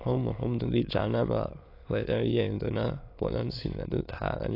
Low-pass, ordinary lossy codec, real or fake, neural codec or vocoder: 5.4 kHz; AAC, 32 kbps; fake; autoencoder, 22.05 kHz, a latent of 192 numbers a frame, VITS, trained on many speakers